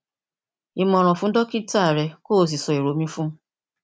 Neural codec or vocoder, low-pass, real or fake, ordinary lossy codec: none; none; real; none